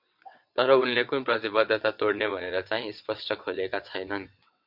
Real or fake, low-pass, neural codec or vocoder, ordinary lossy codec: fake; 5.4 kHz; vocoder, 22.05 kHz, 80 mel bands, WaveNeXt; MP3, 48 kbps